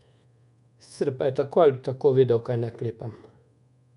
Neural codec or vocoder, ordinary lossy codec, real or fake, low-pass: codec, 24 kHz, 1.2 kbps, DualCodec; none; fake; 10.8 kHz